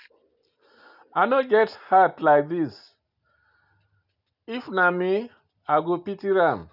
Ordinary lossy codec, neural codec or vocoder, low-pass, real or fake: none; none; 5.4 kHz; real